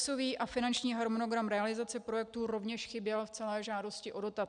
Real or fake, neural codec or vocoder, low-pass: real; none; 9.9 kHz